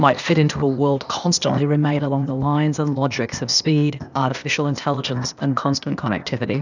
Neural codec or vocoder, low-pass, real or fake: codec, 16 kHz, 0.8 kbps, ZipCodec; 7.2 kHz; fake